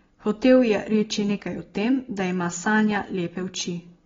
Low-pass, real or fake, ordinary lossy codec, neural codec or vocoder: 7.2 kHz; real; AAC, 24 kbps; none